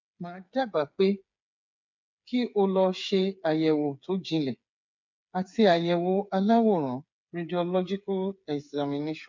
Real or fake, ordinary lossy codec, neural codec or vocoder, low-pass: fake; MP3, 48 kbps; codec, 16 kHz, 8 kbps, FreqCodec, smaller model; 7.2 kHz